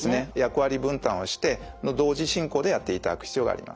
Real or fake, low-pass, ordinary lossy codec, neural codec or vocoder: real; none; none; none